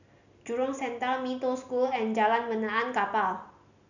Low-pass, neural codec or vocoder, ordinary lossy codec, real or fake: 7.2 kHz; none; none; real